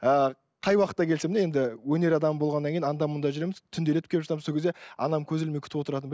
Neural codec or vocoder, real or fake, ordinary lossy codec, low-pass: none; real; none; none